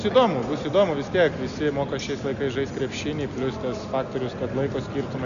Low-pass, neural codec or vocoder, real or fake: 7.2 kHz; none; real